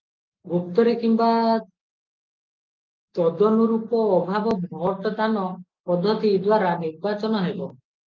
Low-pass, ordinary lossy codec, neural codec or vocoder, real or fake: 7.2 kHz; Opus, 24 kbps; none; real